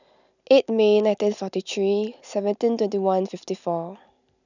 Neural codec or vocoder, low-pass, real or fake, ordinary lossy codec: none; 7.2 kHz; real; none